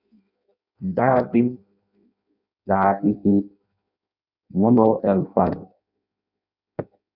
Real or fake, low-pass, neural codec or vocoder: fake; 5.4 kHz; codec, 16 kHz in and 24 kHz out, 0.6 kbps, FireRedTTS-2 codec